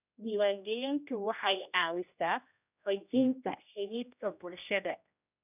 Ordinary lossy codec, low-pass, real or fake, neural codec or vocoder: none; 3.6 kHz; fake; codec, 16 kHz, 1 kbps, X-Codec, HuBERT features, trained on general audio